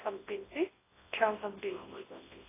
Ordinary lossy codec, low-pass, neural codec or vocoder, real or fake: AAC, 16 kbps; 3.6 kHz; codec, 24 kHz, 0.9 kbps, WavTokenizer, large speech release; fake